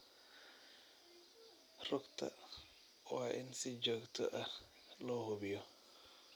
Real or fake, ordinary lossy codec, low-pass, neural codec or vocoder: real; none; none; none